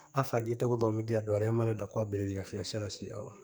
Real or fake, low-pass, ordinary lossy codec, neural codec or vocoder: fake; none; none; codec, 44.1 kHz, 2.6 kbps, SNAC